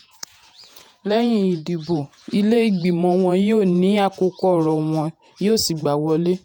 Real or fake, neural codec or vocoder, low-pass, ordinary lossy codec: fake; vocoder, 48 kHz, 128 mel bands, Vocos; 19.8 kHz; none